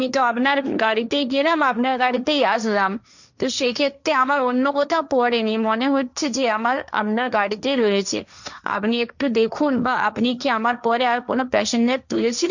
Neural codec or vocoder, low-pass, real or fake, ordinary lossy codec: codec, 16 kHz, 1.1 kbps, Voila-Tokenizer; 7.2 kHz; fake; none